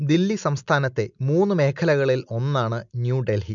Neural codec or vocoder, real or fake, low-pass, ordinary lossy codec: none; real; 7.2 kHz; none